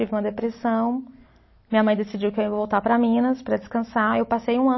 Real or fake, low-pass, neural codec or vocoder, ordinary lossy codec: real; 7.2 kHz; none; MP3, 24 kbps